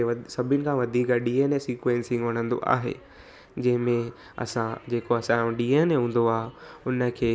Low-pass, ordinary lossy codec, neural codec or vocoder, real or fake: none; none; none; real